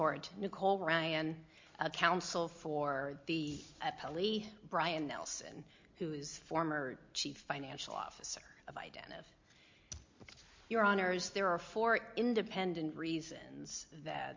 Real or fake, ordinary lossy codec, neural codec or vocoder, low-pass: real; MP3, 48 kbps; none; 7.2 kHz